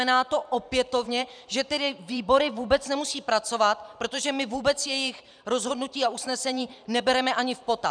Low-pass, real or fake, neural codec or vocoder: 9.9 kHz; fake; vocoder, 44.1 kHz, 128 mel bands every 512 samples, BigVGAN v2